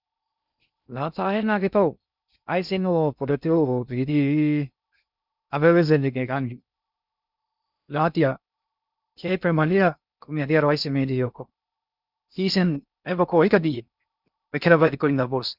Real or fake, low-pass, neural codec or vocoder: fake; 5.4 kHz; codec, 16 kHz in and 24 kHz out, 0.6 kbps, FocalCodec, streaming, 2048 codes